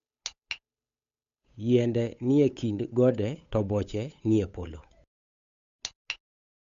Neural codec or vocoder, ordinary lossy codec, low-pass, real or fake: codec, 16 kHz, 8 kbps, FunCodec, trained on Chinese and English, 25 frames a second; AAC, 64 kbps; 7.2 kHz; fake